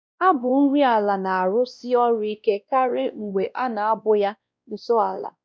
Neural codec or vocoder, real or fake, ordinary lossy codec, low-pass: codec, 16 kHz, 1 kbps, X-Codec, WavLM features, trained on Multilingual LibriSpeech; fake; none; none